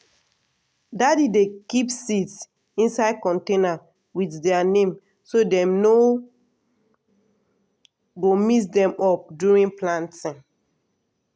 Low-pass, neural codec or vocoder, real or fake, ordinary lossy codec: none; none; real; none